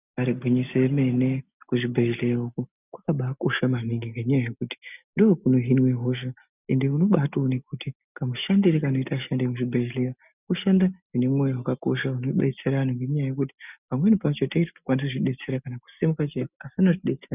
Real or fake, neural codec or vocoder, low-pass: real; none; 3.6 kHz